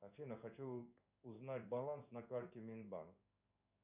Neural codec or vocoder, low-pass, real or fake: codec, 16 kHz in and 24 kHz out, 1 kbps, XY-Tokenizer; 3.6 kHz; fake